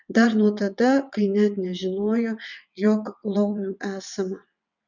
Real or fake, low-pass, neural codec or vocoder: fake; 7.2 kHz; vocoder, 22.05 kHz, 80 mel bands, WaveNeXt